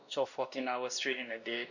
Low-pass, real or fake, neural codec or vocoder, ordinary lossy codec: 7.2 kHz; fake; codec, 16 kHz, 1 kbps, X-Codec, WavLM features, trained on Multilingual LibriSpeech; none